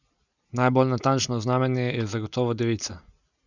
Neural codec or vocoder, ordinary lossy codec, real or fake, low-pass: none; none; real; 7.2 kHz